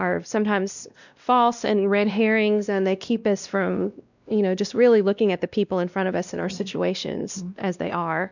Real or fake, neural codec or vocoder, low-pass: fake; codec, 16 kHz, 1 kbps, X-Codec, WavLM features, trained on Multilingual LibriSpeech; 7.2 kHz